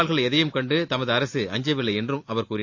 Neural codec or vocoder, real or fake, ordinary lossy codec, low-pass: none; real; AAC, 48 kbps; 7.2 kHz